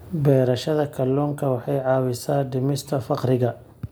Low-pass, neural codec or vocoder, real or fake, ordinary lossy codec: none; none; real; none